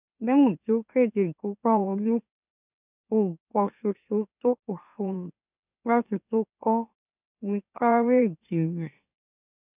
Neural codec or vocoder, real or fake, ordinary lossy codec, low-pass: autoencoder, 44.1 kHz, a latent of 192 numbers a frame, MeloTTS; fake; none; 3.6 kHz